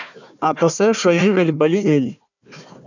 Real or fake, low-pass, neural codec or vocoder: fake; 7.2 kHz; codec, 16 kHz, 1 kbps, FunCodec, trained on Chinese and English, 50 frames a second